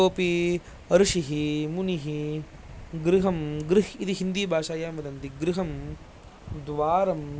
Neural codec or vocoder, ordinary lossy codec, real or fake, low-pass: none; none; real; none